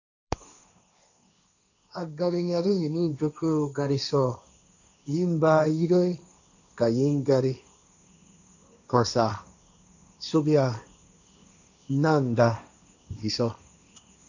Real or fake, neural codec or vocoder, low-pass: fake; codec, 16 kHz, 1.1 kbps, Voila-Tokenizer; 7.2 kHz